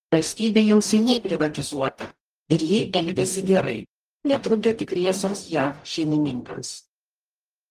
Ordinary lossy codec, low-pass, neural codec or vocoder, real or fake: Opus, 32 kbps; 14.4 kHz; codec, 44.1 kHz, 0.9 kbps, DAC; fake